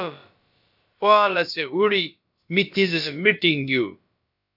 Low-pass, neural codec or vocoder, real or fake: 5.4 kHz; codec, 16 kHz, about 1 kbps, DyCAST, with the encoder's durations; fake